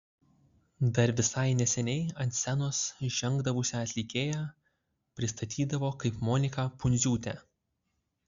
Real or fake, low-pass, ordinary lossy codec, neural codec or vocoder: real; 7.2 kHz; Opus, 64 kbps; none